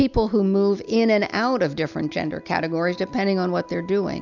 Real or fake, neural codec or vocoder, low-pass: real; none; 7.2 kHz